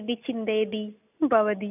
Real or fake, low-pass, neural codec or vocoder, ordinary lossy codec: real; 3.6 kHz; none; none